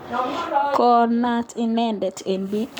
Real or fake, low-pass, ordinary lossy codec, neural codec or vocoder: fake; 19.8 kHz; none; codec, 44.1 kHz, 7.8 kbps, Pupu-Codec